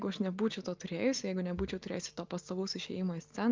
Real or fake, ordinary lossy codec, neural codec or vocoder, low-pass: real; Opus, 32 kbps; none; 7.2 kHz